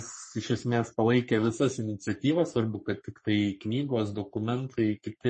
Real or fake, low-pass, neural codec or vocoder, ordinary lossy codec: fake; 10.8 kHz; codec, 44.1 kHz, 3.4 kbps, Pupu-Codec; MP3, 32 kbps